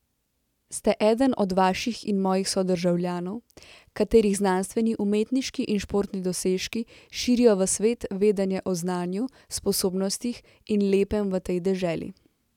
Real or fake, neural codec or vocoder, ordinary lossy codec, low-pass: real; none; none; 19.8 kHz